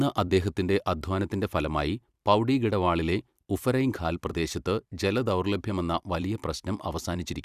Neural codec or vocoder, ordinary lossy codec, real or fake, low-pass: vocoder, 44.1 kHz, 128 mel bands every 256 samples, BigVGAN v2; Opus, 64 kbps; fake; 14.4 kHz